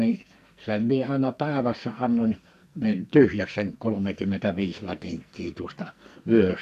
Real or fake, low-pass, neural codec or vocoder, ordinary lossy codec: fake; 14.4 kHz; codec, 44.1 kHz, 2.6 kbps, SNAC; none